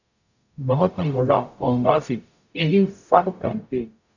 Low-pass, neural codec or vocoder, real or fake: 7.2 kHz; codec, 44.1 kHz, 0.9 kbps, DAC; fake